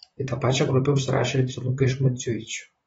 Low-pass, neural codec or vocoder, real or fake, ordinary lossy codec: 19.8 kHz; vocoder, 44.1 kHz, 128 mel bands, Pupu-Vocoder; fake; AAC, 24 kbps